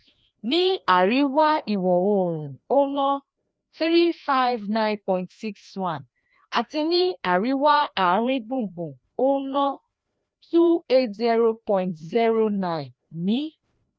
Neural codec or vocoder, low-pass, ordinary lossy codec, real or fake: codec, 16 kHz, 1 kbps, FreqCodec, larger model; none; none; fake